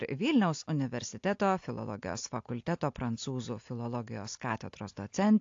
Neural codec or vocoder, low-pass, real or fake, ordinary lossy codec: none; 7.2 kHz; real; AAC, 48 kbps